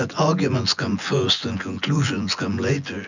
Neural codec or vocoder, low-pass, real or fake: vocoder, 24 kHz, 100 mel bands, Vocos; 7.2 kHz; fake